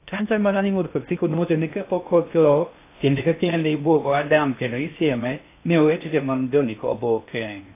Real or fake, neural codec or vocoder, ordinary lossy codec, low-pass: fake; codec, 16 kHz in and 24 kHz out, 0.6 kbps, FocalCodec, streaming, 2048 codes; AAC, 24 kbps; 3.6 kHz